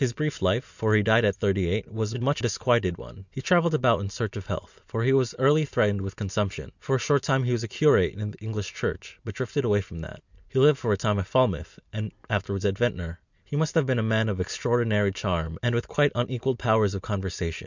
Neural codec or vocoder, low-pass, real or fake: none; 7.2 kHz; real